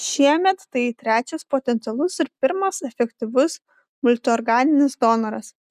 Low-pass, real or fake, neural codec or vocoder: 14.4 kHz; real; none